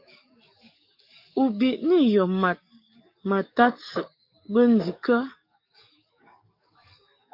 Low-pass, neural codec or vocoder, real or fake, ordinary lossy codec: 5.4 kHz; codec, 44.1 kHz, 7.8 kbps, DAC; fake; MP3, 48 kbps